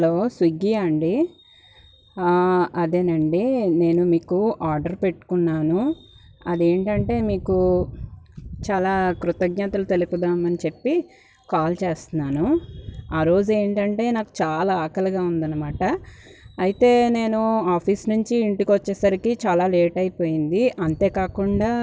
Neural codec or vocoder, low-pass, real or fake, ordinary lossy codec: none; none; real; none